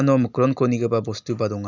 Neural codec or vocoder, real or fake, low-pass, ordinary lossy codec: none; real; 7.2 kHz; none